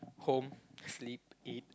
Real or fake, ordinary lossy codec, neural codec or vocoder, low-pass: real; none; none; none